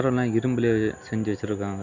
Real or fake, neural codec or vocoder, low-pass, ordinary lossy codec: real; none; 7.2 kHz; none